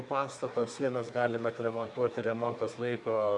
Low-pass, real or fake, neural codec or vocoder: 10.8 kHz; fake; codec, 24 kHz, 1 kbps, SNAC